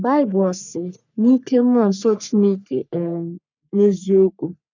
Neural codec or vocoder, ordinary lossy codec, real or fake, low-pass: codec, 44.1 kHz, 3.4 kbps, Pupu-Codec; none; fake; 7.2 kHz